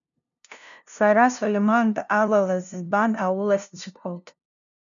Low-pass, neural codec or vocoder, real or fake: 7.2 kHz; codec, 16 kHz, 0.5 kbps, FunCodec, trained on LibriTTS, 25 frames a second; fake